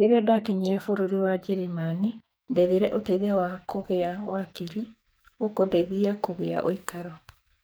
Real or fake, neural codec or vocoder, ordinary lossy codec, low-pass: fake; codec, 44.1 kHz, 2.6 kbps, SNAC; none; none